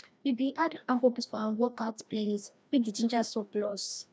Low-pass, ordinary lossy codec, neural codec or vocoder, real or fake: none; none; codec, 16 kHz, 1 kbps, FreqCodec, larger model; fake